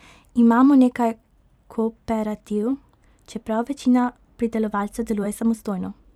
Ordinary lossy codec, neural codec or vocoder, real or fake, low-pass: none; vocoder, 44.1 kHz, 128 mel bands every 512 samples, BigVGAN v2; fake; 19.8 kHz